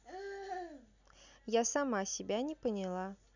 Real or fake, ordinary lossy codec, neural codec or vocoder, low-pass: real; none; none; 7.2 kHz